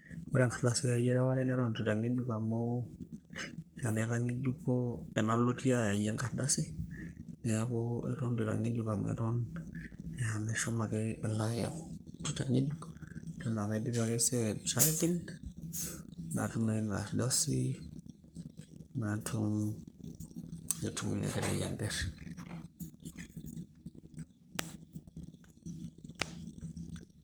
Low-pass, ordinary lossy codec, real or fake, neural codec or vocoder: none; none; fake; codec, 44.1 kHz, 3.4 kbps, Pupu-Codec